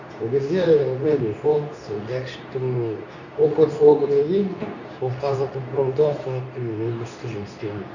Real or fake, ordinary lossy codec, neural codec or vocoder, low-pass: fake; none; codec, 24 kHz, 0.9 kbps, WavTokenizer, medium speech release version 2; 7.2 kHz